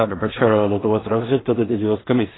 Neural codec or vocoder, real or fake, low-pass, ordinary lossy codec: codec, 16 kHz in and 24 kHz out, 0.4 kbps, LongCat-Audio-Codec, two codebook decoder; fake; 7.2 kHz; AAC, 16 kbps